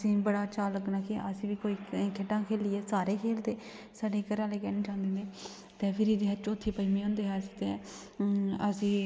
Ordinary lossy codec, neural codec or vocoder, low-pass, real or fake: none; none; none; real